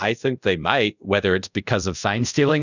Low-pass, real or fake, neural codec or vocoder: 7.2 kHz; fake; codec, 24 kHz, 0.5 kbps, DualCodec